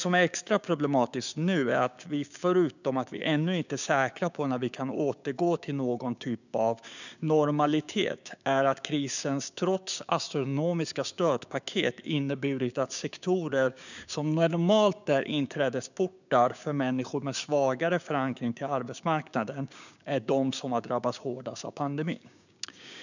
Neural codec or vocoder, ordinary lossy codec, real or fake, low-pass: codec, 16 kHz, 6 kbps, DAC; none; fake; 7.2 kHz